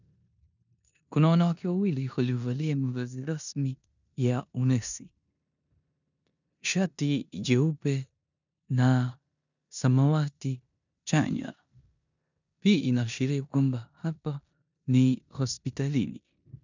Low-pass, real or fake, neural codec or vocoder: 7.2 kHz; fake; codec, 16 kHz in and 24 kHz out, 0.9 kbps, LongCat-Audio-Codec, four codebook decoder